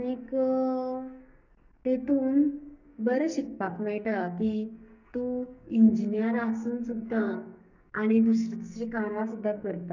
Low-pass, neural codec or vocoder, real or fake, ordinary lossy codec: 7.2 kHz; codec, 44.1 kHz, 2.6 kbps, SNAC; fake; none